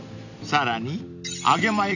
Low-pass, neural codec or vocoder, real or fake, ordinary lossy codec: 7.2 kHz; none; real; none